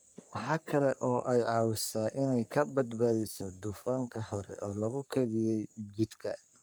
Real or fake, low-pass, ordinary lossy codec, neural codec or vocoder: fake; none; none; codec, 44.1 kHz, 3.4 kbps, Pupu-Codec